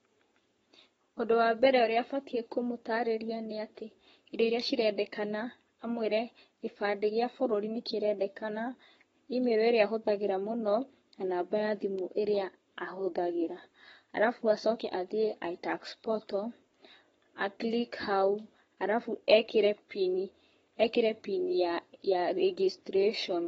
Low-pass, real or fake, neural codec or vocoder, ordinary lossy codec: 19.8 kHz; fake; codec, 44.1 kHz, 7.8 kbps, Pupu-Codec; AAC, 24 kbps